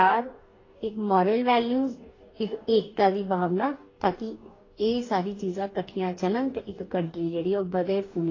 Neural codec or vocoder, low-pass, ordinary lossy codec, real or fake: codec, 44.1 kHz, 2.6 kbps, DAC; 7.2 kHz; AAC, 32 kbps; fake